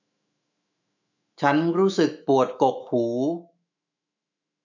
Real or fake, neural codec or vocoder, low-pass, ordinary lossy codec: fake; autoencoder, 48 kHz, 128 numbers a frame, DAC-VAE, trained on Japanese speech; 7.2 kHz; none